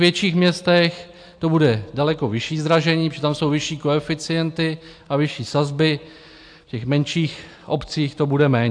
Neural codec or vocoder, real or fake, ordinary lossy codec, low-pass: none; real; AAC, 64 kbps; 9.9 kHz